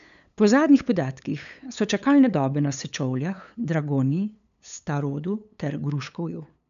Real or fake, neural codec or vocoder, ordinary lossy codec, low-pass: fake; codec, 16 kHz, 8 kbps, FunCodec, trained on LibriTTS, 25 frames a second; none; 7.2 kHz